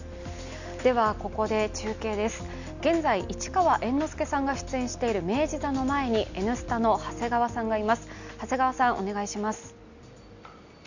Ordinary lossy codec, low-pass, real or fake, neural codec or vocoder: none; 7.2 kHz; real; none